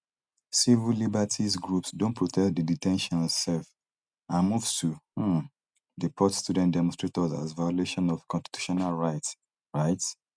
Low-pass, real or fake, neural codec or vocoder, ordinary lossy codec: 9.9 kHz; real; none; none